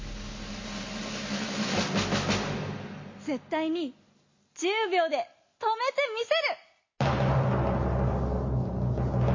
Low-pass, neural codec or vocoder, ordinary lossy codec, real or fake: 7.2 kHz; none; MP3, 32 kbps; real